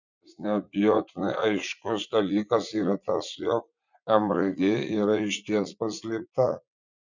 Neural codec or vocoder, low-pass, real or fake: vocoder, 44.1 kHz, 80 mel bands, Vocos; 7.2 kHz; fake